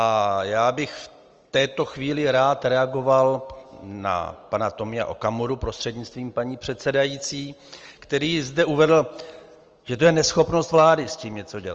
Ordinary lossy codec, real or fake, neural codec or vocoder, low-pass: Opus, 24 kbps; real; none; 7.2 kHz